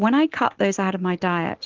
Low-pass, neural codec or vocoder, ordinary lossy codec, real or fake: 7.2 kHz; none; Opus, 16 kbps; real